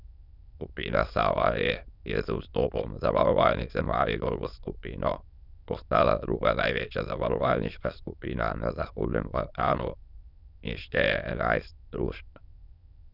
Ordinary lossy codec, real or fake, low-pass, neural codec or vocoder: none; fake; 5.4 kHz; autoencoder, 22.05 kHz, a latent of 192 numbers a frame, VITS, trained on many speakers